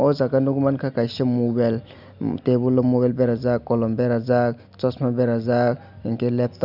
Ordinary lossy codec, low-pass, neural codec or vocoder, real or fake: none; 5.4 kHz; none; real